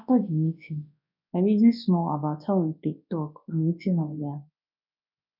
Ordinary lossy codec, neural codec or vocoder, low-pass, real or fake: none; codec, 24 kHz, 0.9 kbps, WavTokenizer, large speech release; 5.4 kHz; fake